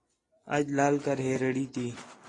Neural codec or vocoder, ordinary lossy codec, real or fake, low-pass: none; AAC, 32 kbps; real; 10.8 kHz